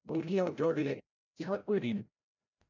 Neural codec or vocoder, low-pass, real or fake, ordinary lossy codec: codec, 16 kHz, 0.5 kbps, FreqCodec, larger model; 7.2 kHz; fake; MP3, 64 kbps